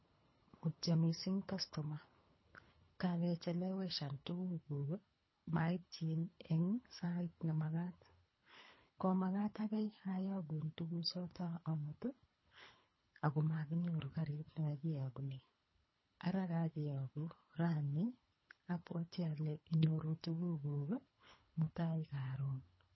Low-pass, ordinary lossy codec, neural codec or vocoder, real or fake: 7.2 kHz; MP3, 24 kbps; codec, 24 kHz, 3 kbps, HILCodec; fake